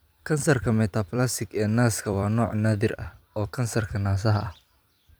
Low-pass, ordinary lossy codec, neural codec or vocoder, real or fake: none; none; vocoder, 44.1 kHz, 128 mel bands every 256 samples, BigVGAN v2; fake